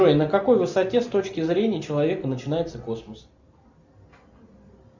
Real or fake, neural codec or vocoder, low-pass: real; none; 7.2 kHz